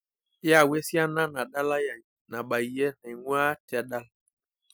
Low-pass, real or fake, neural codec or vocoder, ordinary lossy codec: none; real; none; none